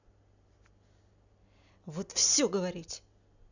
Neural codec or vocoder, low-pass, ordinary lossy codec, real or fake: none; 7.2 kHz; none; real